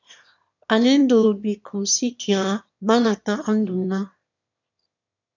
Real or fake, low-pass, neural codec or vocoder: fake; 7.2 kHz; autoencoder, 22.05 kHz, a latent of 192 numbers a frame, VITS, trained on one speaker